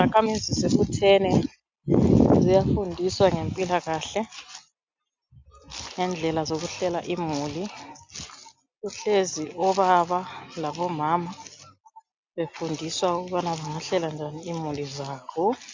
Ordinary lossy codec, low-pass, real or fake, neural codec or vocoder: MP3, 64 kbps; 7.2 kHz; real; none